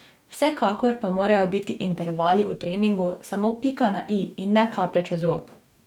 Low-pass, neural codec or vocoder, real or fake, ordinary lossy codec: 19.8 kHz; codec, 44.1 kHz, 2.6 kbps, DAC; fake; none